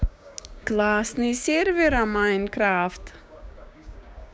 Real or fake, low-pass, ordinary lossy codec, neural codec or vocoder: fake; none; none; codec, 16 kHz, 6 kbps, DAC